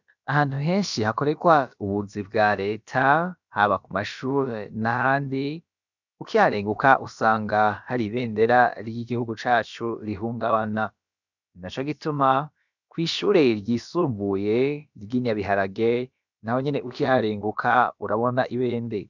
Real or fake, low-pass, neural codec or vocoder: fake; 7.2 kHz; codec, 16 kHz, about 1 kbps, DyCAST, with the encoder's durations